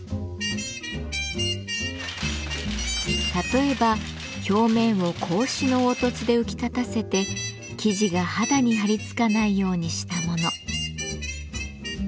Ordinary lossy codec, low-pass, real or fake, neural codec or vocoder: none; none; real; none